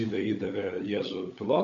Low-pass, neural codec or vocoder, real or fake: 7.2 kHz; codec, 16 kHz, 16 kbps, FunCodec, trained on LibriTTS, 50 frames a second; fake